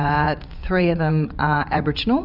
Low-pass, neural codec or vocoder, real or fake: 5.4 kHz; vocoder, 44.1 kHz, 80 mel bands, Vocos; fake